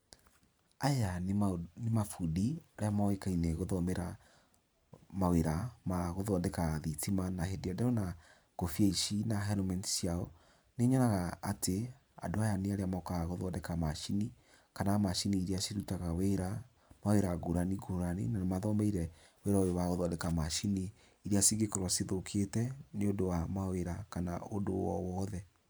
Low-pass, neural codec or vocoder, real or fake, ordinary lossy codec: none; vocoder, 44.1 kHz, 128 mel bands every 512 samples, BigVGAN v2; fake; none